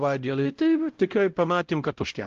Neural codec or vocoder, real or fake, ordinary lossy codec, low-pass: codec, 16 kHz, 0.5 kbps, X-Codec, WavLM features, trained on Multilingual LibriSpeech; fake; Opus, 16 kbps; 7.2 kHz